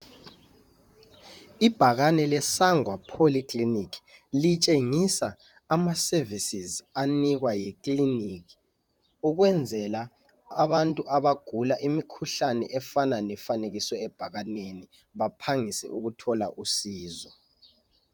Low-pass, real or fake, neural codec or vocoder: 19.8 kHz; fake; vocoder, 44.1 kHz, 128 mel bands, Pupu-Vocoder